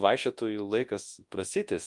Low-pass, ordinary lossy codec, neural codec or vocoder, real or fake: 10.8 kHz; Opus, 32 kbps; codec, 24 kHz, 0.9 kbps, WavTokenizer, large speech release; fake